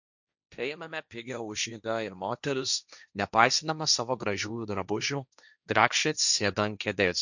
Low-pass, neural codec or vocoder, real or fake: 7.2 kHz; codec, 16 kHz, 1.1 kbps, Voila-Tokenizer; fake